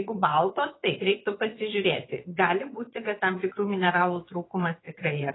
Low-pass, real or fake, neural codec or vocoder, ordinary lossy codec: 7.2 kHz; fake; vocoder, 22.05 kHz, 80 mel bands, WaveNeXt; AAC, 16 kbps